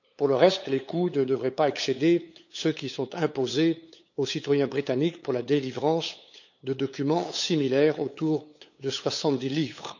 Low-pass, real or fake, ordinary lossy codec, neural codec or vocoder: 7.2 kHz; fake; none; codec, 16 kHz, 8 kbps, FunCodec, trained on LibriTTS, 25 frames a second